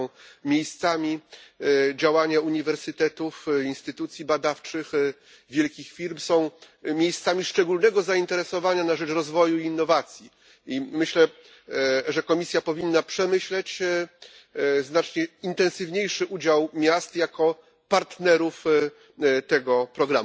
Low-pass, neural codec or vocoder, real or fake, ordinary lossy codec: none; none; real; none